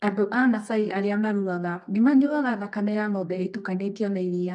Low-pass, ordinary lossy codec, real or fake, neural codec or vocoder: 10.8 kHz; none; fake; codec, 24 kHz, 0.9 kbps, WavTokenizer, medium music audio release